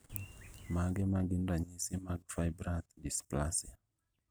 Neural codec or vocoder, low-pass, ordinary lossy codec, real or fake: none; none; none; real